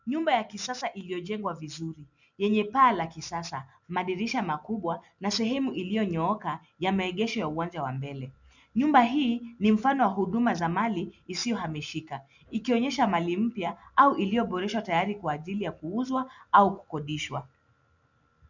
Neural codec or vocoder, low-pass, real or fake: none; 7.2 kHz; real